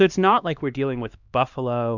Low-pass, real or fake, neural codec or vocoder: 7.2 kHz; fake; codec, 16 kHz, 2 kbps, X-Codec, HuBERT features, trained on LibriSpeech